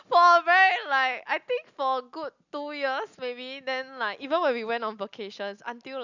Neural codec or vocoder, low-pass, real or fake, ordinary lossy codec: none; 7.2 kHz; real; none